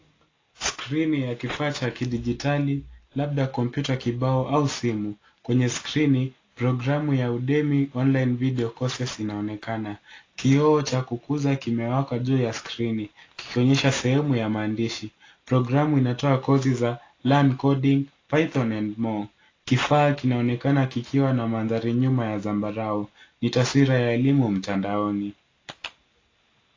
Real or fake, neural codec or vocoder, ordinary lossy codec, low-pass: real; none; AAC, 32 kbps; 7.2 kHz